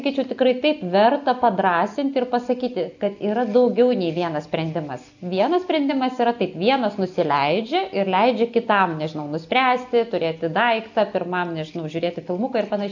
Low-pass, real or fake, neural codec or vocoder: 7.2 kHz; real; none